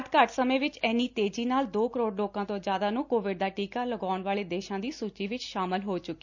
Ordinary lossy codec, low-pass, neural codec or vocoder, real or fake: none; 7.2 kHz; none; real